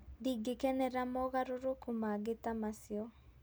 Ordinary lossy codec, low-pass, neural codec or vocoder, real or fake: none; none; none; real